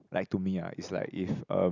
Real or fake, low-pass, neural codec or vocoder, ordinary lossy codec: real; 7.2 kHz; none; none